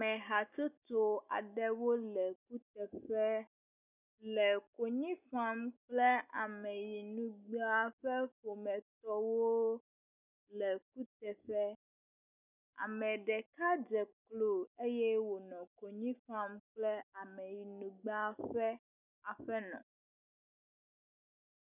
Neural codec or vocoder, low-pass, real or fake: none; 3.6 kHz; real